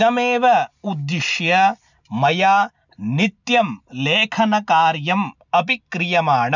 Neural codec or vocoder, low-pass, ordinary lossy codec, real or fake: none; 7.2 kHz; none; real